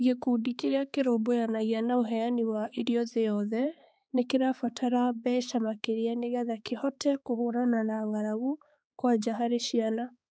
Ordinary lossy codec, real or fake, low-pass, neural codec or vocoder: none; fake; none; codec, 16 kHz, 4 kbps, X-Codec, HuBERT features, trained on balanced general audio